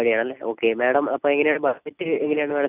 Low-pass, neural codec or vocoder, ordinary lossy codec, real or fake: 3.6 kHz; none; none; real